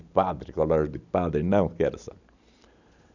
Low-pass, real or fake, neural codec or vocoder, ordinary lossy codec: 7.2 kHz; real; none; none